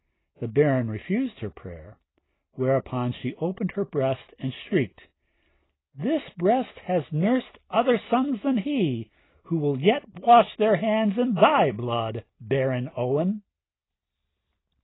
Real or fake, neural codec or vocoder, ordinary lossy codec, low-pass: real; none; AAC, 16 kbps; 7.2 kHz